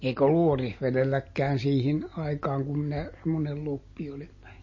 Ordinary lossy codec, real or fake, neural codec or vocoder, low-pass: MP3, 32 kbps; real; none; 7.2 kHz